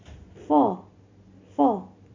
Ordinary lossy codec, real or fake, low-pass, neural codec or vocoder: MP3, 48 kbps; real; 7.2 kHz; none